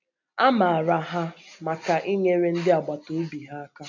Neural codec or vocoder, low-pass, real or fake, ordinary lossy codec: none; 7.2 kHz; real; none